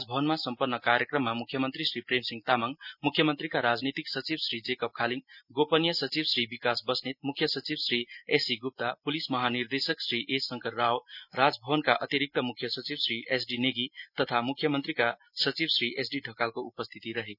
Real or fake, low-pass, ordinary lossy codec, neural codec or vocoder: real; 5.4 kHz; none; none